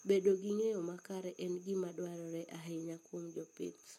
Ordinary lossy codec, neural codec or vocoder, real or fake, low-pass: MP3, 64 kbps; none; real; 19.8 kHz